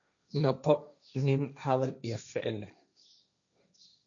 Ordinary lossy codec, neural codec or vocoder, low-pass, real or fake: none; codec, 16 kHz, 1.1 kbps, Voila-Tokenizer; 7.2 kHz; fake